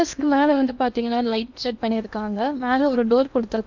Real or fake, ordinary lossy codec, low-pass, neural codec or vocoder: fake; none; 7.2 kHz; codec, 16 kHz in and 24 kHz out, 0.8 kbps, FocalCodec, streaming, 65536 codes